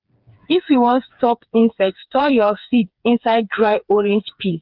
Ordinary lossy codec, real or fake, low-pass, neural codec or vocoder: Opus, 24 kbps; fake; 5.4 kHz; codec, 16 kHz, 4 kbps, FreqCodec, smaller model